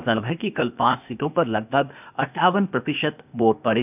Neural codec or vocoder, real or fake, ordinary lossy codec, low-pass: codec, 16 kHz, 0.8 kbps, ZipCodec; fake; none; 3.6 kHz